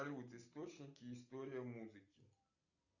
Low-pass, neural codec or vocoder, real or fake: 7.2 kHz; none; real